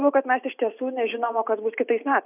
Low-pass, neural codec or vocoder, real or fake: 3.6 kHz; none; real